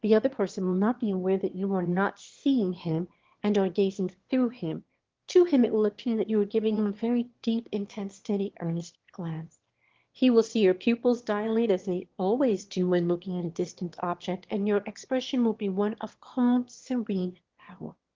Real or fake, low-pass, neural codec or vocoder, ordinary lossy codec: fake; 7.2 kHz; autoencoder, 22.05 kHz, a latent of 192 numbers a frame, VITS, trained on one speaker; Opus, 16 kbps